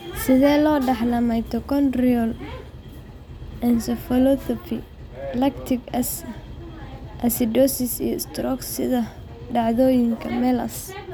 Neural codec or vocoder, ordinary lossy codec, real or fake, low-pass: none; none; real; none